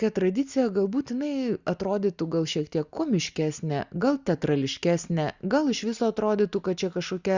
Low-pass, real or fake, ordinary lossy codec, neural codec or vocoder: 7.2 kHz; real; Opus, 64 kbps; none